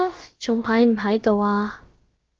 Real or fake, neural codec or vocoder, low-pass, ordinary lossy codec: fake; codec, 16 kHz, about 1 kbps, DyCAST, with the encoder's durations; 7.2 kHz; Opus, 16 kbps